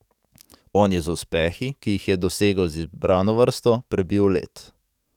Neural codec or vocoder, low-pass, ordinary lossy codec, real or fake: codec, 44.1 kHz, 7.8 kbps, DAC; 19.8 kHz; none; fake